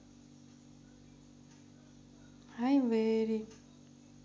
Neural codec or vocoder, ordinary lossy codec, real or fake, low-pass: none; none; real; none